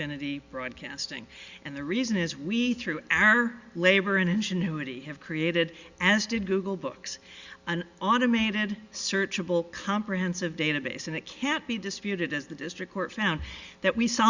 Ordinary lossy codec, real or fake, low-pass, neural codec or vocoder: Opus, 64 kbps; real; 7.2 kHz; none